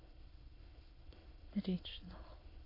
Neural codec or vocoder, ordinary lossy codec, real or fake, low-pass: autoencoder, 22.05 kHz, a latent of 192 numbers a frame, VITS, trained on many speakers; MP3, 24 kbps; fake; 5.4 kHz